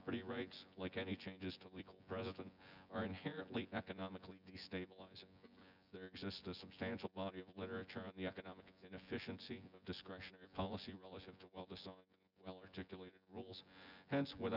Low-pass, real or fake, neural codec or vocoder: 5.4 kHz; fake; vocoder, 24 kHz, 100 mel bands, Vocos